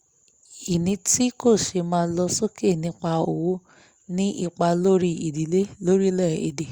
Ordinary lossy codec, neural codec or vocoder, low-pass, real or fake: Opus, 64 kbps; none; 19.8 kHz; real